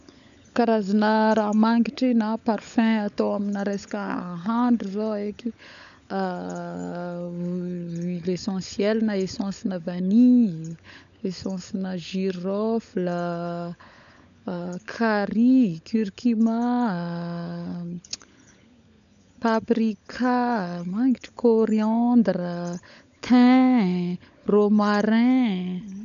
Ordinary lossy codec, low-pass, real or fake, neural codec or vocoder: none; 7.2 kHz; fake; codec, 16 kHz, 16 kbps, FunCodec, trained on LibriTTS, 50 frames a second